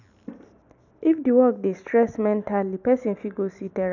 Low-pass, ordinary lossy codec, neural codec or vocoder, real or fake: 7.2 kHz; none; none; real